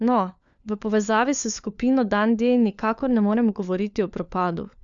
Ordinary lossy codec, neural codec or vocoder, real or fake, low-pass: Opus, 64 kbps; codec, 16 kHz, 4 kbps, FunCodec, trained on LibriTTS, 50 frames a second; fake; 7.2 kHz